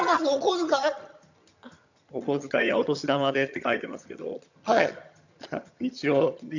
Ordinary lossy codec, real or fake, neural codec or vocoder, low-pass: none; fake; vocoder, 22.05 kHz, 80 mel bands, HiFi-GAN; 7.2 kHz